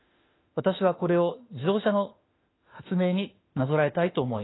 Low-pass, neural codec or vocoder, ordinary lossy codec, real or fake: 7.2 kHz; autoencoder, 48 kHz, 32 numbers a frame, DAC-VAE, trained on Japanese speech; AAC, 16 kbps; fake